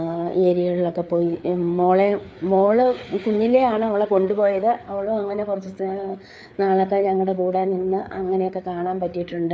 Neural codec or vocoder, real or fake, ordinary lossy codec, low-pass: codec, 16 kHz, 4 kbps, FreqCodec, larger model; fake; none; none